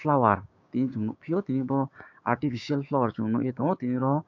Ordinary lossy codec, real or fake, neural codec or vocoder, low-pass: AAC, 48 kbps; fake; codec, 16 kHz, 6 kbps, DAC; 7.2 kHz